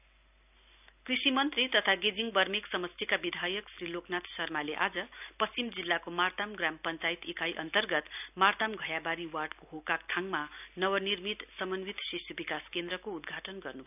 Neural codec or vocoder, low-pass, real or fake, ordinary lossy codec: none; 3.6 kHz; real; none